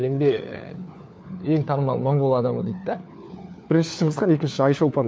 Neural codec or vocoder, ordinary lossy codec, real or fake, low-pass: codec, 16 kHz, 4 kbps, FunCodec, trained on LibriTTS, 50 frames a second; none; fake; none